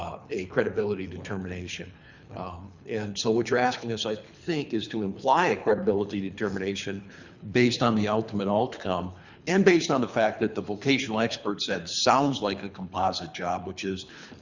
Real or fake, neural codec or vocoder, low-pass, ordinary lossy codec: fake; codec, 24 kHz, 3 kbps, HILCodec; 7.2 kHz; Opus, 64 kbps